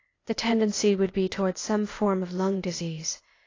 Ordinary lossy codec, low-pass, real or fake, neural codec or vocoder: AAC, 32 kbps; 7.2 kHz; fake; codec, 16 kHz, 0.8 kbps, ZipCodec